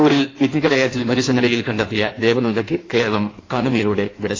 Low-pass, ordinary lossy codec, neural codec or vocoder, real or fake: 7.2 kHz; AAC, 32 kbps; codec, 16 kHz in and 24 kHz out, 1.1 kbps, FireRedTTS-2 codec; fake